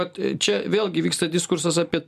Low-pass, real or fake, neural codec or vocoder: 14.4 kHz; real; none